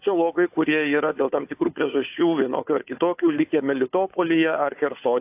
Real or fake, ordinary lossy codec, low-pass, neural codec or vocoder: fake; AAC, 32 kbps; 3.6 kHz; codec, 16 kHz, 4 kbps, FunCodec, trained on Chinese and English, 50 frames a second